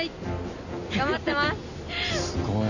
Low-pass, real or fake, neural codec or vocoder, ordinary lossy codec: 7.2 kHz; real; none; none